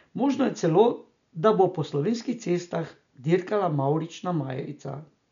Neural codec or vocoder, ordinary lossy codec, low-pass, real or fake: none; none; 7.2 kHz; real